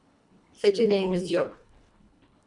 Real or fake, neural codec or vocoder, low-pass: fake; codec, 24 kHz, 1.5 kbps, HILCodec; 10.8 kHz